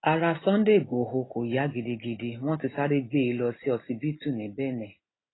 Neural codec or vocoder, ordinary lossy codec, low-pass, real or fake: none; AAC, 16 kbps; 7.2 kHz; real